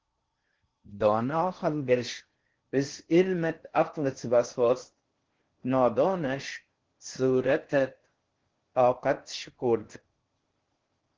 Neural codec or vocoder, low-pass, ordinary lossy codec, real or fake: codec, 16 kHz in and 24 kHz out, 0.6 kbps, FocalCodec, streaming, 4096 codes; 7.2 kHz; Opus, 16 kbps; fake